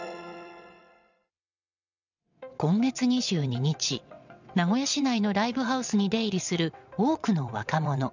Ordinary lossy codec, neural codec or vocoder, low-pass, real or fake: none; vocoder, 22.05 kHz, 80 mel bands, WaveNeXt; 7.2 kHz; fake